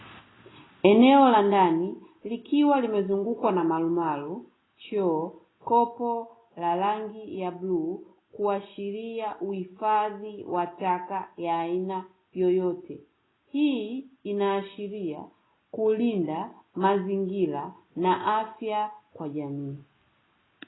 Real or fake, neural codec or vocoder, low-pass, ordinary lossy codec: real; none; 7.2 kHz; AAC, 16 kbps